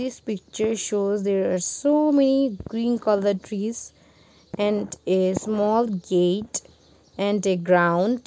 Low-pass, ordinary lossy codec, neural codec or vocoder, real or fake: none; none; none; real